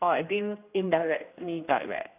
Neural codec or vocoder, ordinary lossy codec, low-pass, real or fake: codec, 16 kHz, 1 kbps, X-Codec, HuBERT features, trained on general audio; AAC, 32 kbps; 3.6 kHz; fake